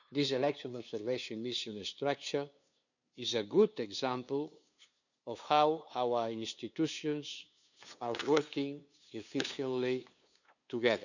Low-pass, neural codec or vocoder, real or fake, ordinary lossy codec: 7.2 kHz; codec, 16 kHz, 2 kbps, FunCodec, trained on LibriTTS, 25 frames a second; fake; none